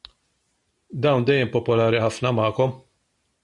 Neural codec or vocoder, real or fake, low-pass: none; real; 10.8 kHz